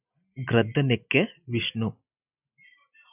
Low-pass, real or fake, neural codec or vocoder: 3.6 kHz; real; none